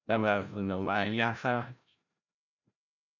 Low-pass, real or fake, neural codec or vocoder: 7.2 kHz; fake; codec, 16 kHz, 0.5 kbps, FreqCodec, larger model